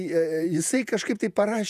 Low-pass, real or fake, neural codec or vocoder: 14.4 kHz; fake; vocoder, 48 kHz, 128 mel bands, Vocos